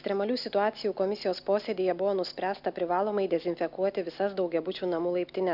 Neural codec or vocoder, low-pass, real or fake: none; 5.4 kHz; real